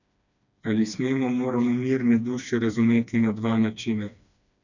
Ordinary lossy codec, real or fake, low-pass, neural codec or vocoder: none; fake; 7.2 kHz; codec, 16 kHz, 2 kbps, FreqCodec, smaller model